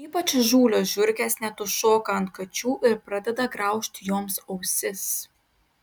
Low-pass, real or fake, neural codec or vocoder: 19.8 kHz; real; none